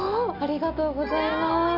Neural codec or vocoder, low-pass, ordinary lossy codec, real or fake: none; 5.4 kHz; none; real